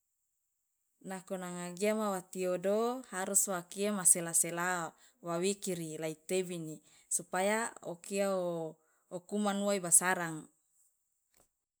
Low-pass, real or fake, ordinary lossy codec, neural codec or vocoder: none; real; none; none